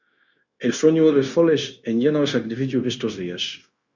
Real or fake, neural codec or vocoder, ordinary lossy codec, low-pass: fake; codec, 16 kHz, 0.9 kbps, LongCat-Audio-Codec; Opus, 64 kbps; 7.2 kHz